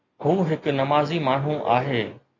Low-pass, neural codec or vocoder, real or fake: 7.2 kHz; none; real